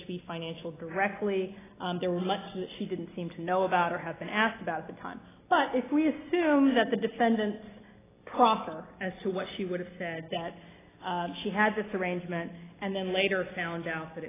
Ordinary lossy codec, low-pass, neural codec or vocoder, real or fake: AAC, 16 kbps; 3.6 kHz; none; real